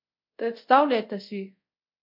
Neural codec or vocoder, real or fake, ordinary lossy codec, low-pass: codec, 24 kHz, 0.5 kbps, DualCodec; fake; MP3, 48 kbps; 5.4 kHz